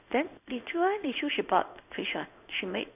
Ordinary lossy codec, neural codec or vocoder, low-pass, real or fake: none; codec, 16 kHz in and 24 kHz out, 1 kbps, XY-Tokenizer; 3.6 kHz; fake